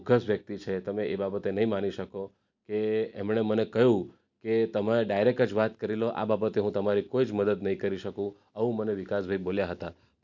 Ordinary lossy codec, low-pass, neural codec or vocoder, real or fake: none; 7.2 kHz; none; real